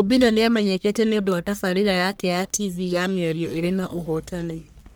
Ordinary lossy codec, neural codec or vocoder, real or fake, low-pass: none; codec, 44.1 kHz, 1.7 kbps, Pupu-Codec; fake; none